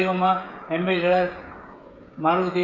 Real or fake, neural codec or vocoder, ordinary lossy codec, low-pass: fake; codec, 16 kHz, 16 kbps, FreqCodec, smaller model; MP3, 64 kbps; 7.2 kHz